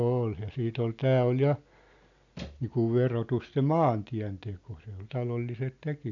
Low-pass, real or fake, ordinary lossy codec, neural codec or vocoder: 7.2 kHz; real; none; none